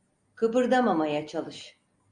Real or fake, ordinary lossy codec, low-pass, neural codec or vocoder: real; Opus, 64 kbps; 9.9 kHz; none